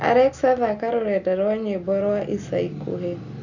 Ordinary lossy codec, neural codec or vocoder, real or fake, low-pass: none; none; real; 7.2 kHz